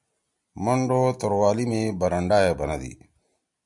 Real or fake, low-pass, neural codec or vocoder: real; 10.8 kHz; none